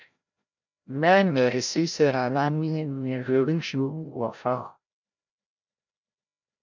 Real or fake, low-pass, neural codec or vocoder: fake; 7.2 kHz; codec, 16 kHz, 0.5 kbps, FreqCodec, larger model